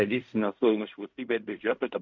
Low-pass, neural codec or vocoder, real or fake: 7.2 kHz; codec, 16 kHz in and 24 kHz out, 0.4 kbps, LongCat-Audio-Codec, fine tuned four codebook decoder; fake